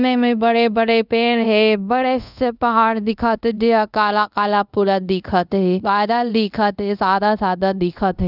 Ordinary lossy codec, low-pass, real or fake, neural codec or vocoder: none; 5.4 kHz; fake; codec, 24 kHz, 0.5 kbps, DualCodec